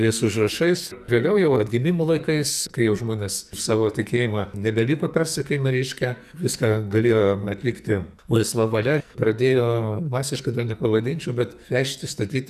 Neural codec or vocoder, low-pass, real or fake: codec, 44.1 kHz, 2.6 kbps, SNAC; 14.4 kHz; fake